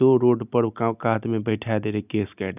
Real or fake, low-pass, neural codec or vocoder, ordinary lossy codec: real; 3.6 kHz; none; none